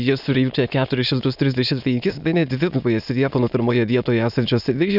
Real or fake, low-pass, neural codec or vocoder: fake; 5.4 kHz; autoencoder, 22.05 kHz, a latent of 192 numbers a frame, VITS, trained on many speakers